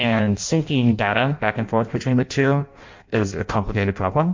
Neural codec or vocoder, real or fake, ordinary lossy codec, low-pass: codec, 16 kHz in and 24 kHz out, 0.6 kbps, FireRedTTS-2 codec; fake; MP3, 48 kbps; 7.2 kHz